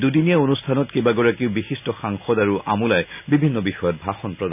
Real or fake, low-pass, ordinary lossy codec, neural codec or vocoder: real; 3.6 kHz; none; none